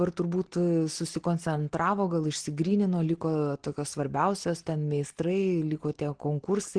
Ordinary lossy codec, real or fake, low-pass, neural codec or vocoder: Opus, 16 kbps; real; 9.9 kHz; none